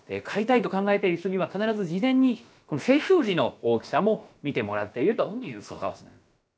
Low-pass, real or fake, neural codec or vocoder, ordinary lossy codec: none; fake; codec, 16 kHz, about 1 kbps, DyCAST, with the encoder's durations; none